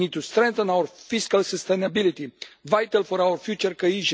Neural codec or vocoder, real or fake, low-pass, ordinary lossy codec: none; real; none; none